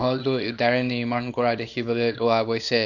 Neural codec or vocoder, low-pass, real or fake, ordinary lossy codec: codec, 24 kHz, 0.9 kbps, WavTokenizer, small release; 7.2 kHz; fake; none